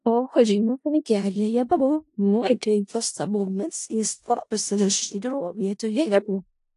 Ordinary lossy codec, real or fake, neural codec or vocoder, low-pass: AAC, 48 kbps; fake; codec, 16 kHz in and 24 kHz out, 0.4 kbps, LongCat-Audio-Codec, four codebook decoder; 10.8 kHz